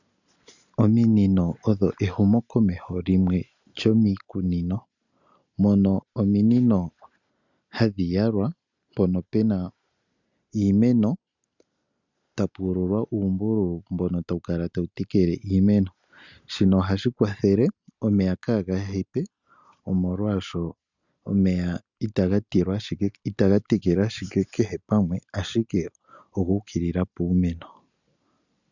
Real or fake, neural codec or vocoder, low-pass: real; none; 7.2 kHz